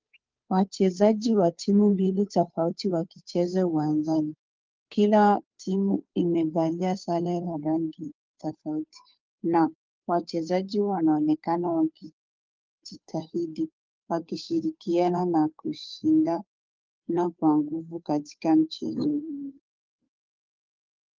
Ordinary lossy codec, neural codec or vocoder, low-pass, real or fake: Opus, 24 kbps; codec, 16 kHz, 2 kbps, FunCodec, trained on Chinese and English, 25 frames a second; 7.2 kHz; fake